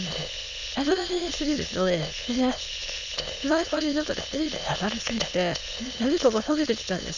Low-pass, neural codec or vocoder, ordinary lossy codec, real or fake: 7.2 kHz; autoencoder, 22.05 kHz, a latent of 192 numbers a frame, VITS, trained on many speakers; none; fake